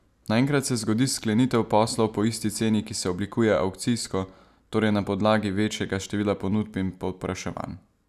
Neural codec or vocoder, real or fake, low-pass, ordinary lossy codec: none; real; 14.4 kHz; none